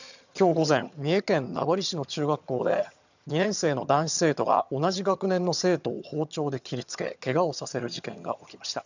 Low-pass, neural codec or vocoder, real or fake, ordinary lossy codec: 7.2 kHz; vocoder, 22.05 kHz, 80 mel bands, HiFi-GAN; fake; none